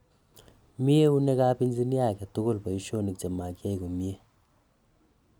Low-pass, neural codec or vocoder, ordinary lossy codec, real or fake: none; none; none; real